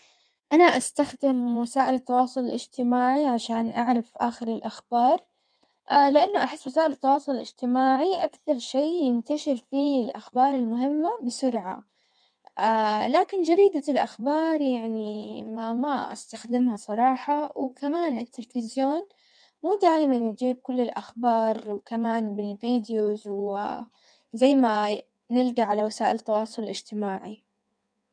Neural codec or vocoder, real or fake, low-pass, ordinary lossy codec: codec, 16 kHz in and 24 kHz out, 1.1 kbps, FireRedTTS-2 codec; fake; 9.9 kHz; none